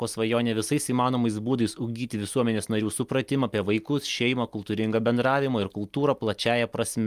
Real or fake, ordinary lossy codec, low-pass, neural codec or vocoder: real; Opus, 32 kbps; 14.4 kHz; none